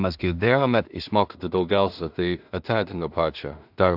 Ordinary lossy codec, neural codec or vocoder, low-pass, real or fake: AAC, 48 kbps; codec, 16 kHz in and 24 kHz out, 0.4 kbps, LongCat-Audio-Codec, two codebook decoder; 5.4 kHz; fake